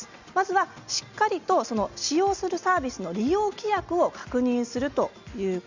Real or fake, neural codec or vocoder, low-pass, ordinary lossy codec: real; none; 7.2 kHz; Opus, 64 kbps